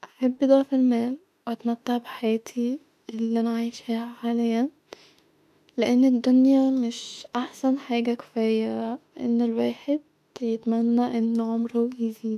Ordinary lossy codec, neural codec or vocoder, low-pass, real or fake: none; autoencoder, 48 kHz, 32 numbers a frame, DAC-VAE, trained on Japanese speech; 14.4 kHz; fake